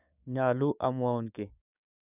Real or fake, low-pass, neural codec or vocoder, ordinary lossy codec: fake; 3.6 kHz; codec, 16 kHz, 6 kbps, DAC; none